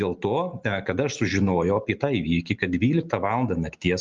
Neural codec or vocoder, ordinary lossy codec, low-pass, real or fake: none; Opus, 32 kbps; 7.2 kHz; real